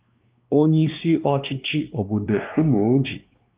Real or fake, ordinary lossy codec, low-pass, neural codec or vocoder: fake; Opus, 24 kbps; 3.6 kHz; codec, 16 kHz, 2 kbps, X-Codec, WavLM features, trained on Multilingual LibriSpeech